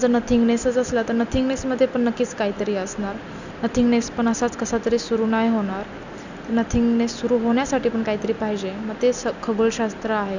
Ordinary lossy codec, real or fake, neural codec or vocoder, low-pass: none; real; none; 7.2 kHz